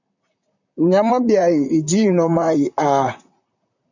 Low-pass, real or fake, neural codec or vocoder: 7.2 kHz; fake; vocoder, 44.1 kHz, 128 mel bands, Pupu-Vocoder